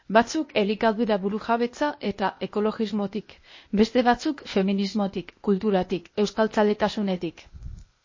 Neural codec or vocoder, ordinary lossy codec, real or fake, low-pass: codec, 16 kHz, 0.8 kbps, ZipCodec; MP3, 32 kbps; fake; 7.2 kHz